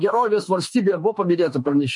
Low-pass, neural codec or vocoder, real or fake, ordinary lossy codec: 10.8 kHz; autoencoder, 48 kHz, 32 numbers a frame, DAC-VAE, trained on Japanese speech; fake; MP3, 48 kbps